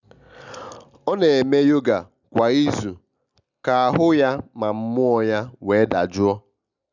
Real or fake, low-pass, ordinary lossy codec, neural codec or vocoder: real; 7.2 kHz; none; none